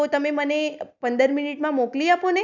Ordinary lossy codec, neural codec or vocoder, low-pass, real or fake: none; none; 7.2 kHz; real